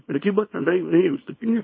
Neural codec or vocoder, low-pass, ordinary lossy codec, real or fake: codec, 24 kHz, 0.9 kbps, WavTokenizer, small release; 7.2 kHz; MP3, 24 kbps; fake